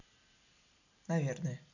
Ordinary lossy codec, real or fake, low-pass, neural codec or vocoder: none; real; 7.2 kHz; none